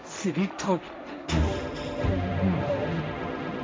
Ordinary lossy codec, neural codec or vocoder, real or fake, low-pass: none; codec, 16 kHz, 1.1 kbps, Voila-Tokenizer; fake; none